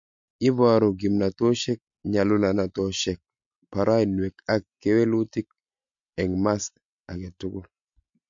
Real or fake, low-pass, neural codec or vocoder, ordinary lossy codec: real; 7.2 kHz; none; MP3, 48 kbps